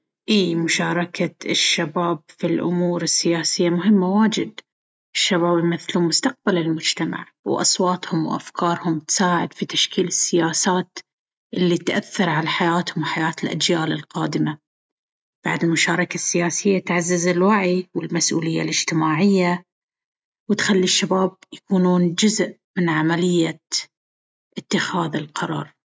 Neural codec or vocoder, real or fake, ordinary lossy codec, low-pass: none; real; none; none